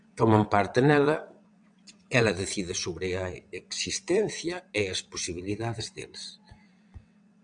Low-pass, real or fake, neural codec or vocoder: 9.9 kHz; fake; vocoder, 22.05 kHz, 80 mel bands, WaveNeXt